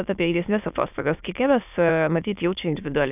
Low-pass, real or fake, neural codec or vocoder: 3.6 kHz; fake; autoencoder, 22.05 kHz, a latent of 192 numbers a frame, VITS, trained on many speakers